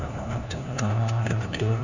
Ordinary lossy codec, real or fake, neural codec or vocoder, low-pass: none; fake; codec, 16 kHz, 1 kbps, FunCodec, trained on LibriTTS, 50 frames a second; 7.2 kHz